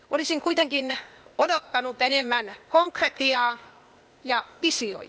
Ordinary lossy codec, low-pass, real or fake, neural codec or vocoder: none; none; fake; codec, 16 kHz, 0.8 kbps, ZipCodec